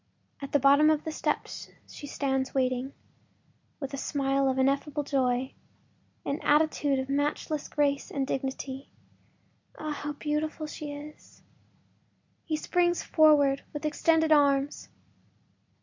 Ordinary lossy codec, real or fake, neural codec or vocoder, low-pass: MP3, 64 kbps; real; none; 7.2 kHz